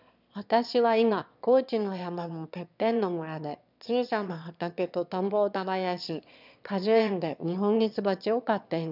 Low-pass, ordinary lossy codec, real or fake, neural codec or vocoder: 5.4 kHz; none; fake; autoencoder, 22.05 kHz, a latent of 192 numbers a frame, VITS, trained on one speaker